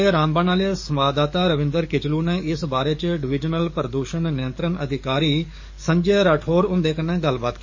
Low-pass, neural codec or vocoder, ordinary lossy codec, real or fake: 7.2 kHz; codec, 44.1 kHz, 7.8 kbps, DAC; MP3, 32 kbps; fake